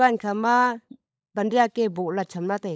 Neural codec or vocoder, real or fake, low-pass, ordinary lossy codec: codec, 16 kHz, 16 kbps, FunCodec, trained on LibriTTS, 50 frames a second; fake; none; none